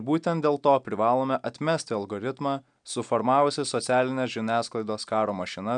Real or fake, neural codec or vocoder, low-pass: real; none; 9.9 kHz